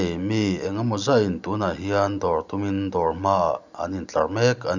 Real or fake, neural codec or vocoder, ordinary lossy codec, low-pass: real; none; none; 7.2 kHz